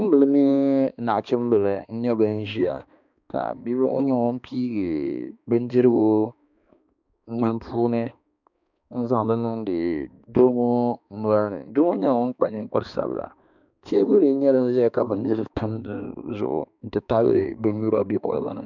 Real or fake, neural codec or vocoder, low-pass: fake; codec, 16 kHz, 2 kbps, X-Codec, HuBERT features, trained on balanced general audio; 7.2 kHz